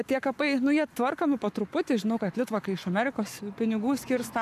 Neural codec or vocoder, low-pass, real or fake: codec, 44.1 kHz, 7.8 kbps, Pupu-Codec; 14.4 kHz; fake